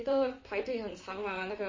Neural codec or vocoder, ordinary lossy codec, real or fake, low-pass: vocoder, 44.1 kHz, 128 mel bands, Pupu-Vocoder; MP3, 32 kbps; fake; 7.2 kHz